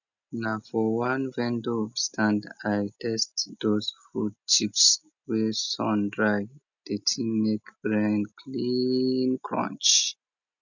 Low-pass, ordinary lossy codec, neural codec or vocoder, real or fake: 7.2 kHz; none; none; real